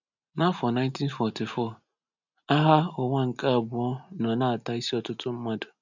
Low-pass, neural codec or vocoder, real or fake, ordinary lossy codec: 7.2 kHz; none; real; none